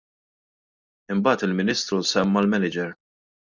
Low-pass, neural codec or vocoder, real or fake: 7.2 kHz; none; real